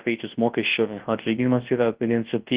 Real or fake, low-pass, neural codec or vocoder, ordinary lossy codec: fake; 3.6 kHz; codec, 24 kHz, 0.9 kbps, WavTokenizer, large speech release; Opus, 16 kbps